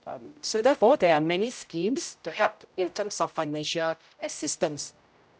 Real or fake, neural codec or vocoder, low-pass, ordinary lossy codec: fake; codec, 16 kHz, 0.5 kbps, X-Codec, HuBERT features, trained on general audio; none; none